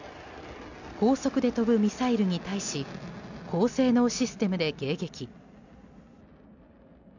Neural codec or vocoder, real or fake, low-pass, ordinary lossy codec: none; real; 7.2 kHz; none